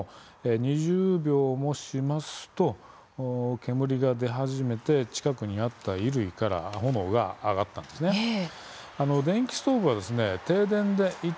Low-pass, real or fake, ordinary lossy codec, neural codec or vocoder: none; real; none; none